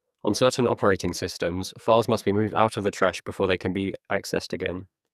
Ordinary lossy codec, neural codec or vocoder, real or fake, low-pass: none; codec, 44.1 kHz, 2.6 kbps, SNAC; fake; 14.4 kHz